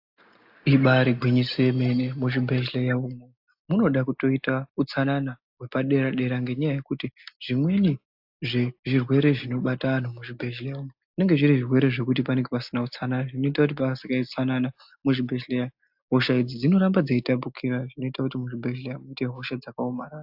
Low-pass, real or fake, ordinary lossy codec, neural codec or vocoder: 5.4 kHz; real; AAC, 48 kbps; none